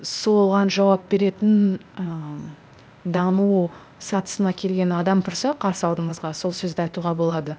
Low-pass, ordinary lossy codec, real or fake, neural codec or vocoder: none; none; fake; codec, 16 kHz, 0.8 kbps, ZipCodec